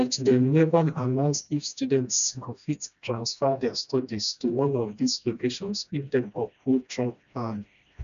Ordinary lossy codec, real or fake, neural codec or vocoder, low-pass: none; fake; codec, 16 kHz, 1 kbps, FreqCodec, smaller model; 7.2 kHz